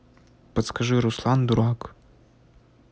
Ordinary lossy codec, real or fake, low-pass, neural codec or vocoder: none; real; none; none